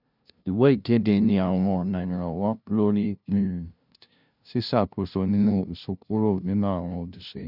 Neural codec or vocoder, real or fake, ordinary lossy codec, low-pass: codec, 16 kHz, 0.5 kbps, FunCodec, trained on LibriTTS, 25 frames a second; fake; none; 5.4 kHz